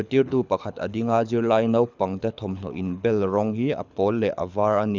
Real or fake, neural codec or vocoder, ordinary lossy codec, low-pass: fake; codec, 24 kHz, 6 kbps, HILCodec; none; 7.2 kHz